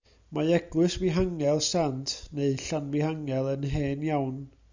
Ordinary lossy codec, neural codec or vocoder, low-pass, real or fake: Opus, 64 kbps; none; 7.2 kHz; real